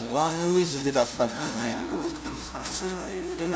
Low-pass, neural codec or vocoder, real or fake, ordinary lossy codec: none; codec, 16 kHz, 0.5 kbps, FunCodec, trained on LibriTTS, 25 frames a second; fake; none